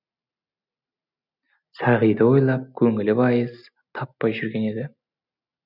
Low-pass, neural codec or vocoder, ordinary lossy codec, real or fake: 5.4 kHz; none; none; real